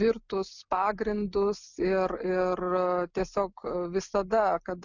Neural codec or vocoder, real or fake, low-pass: none; real; 7.2 kHz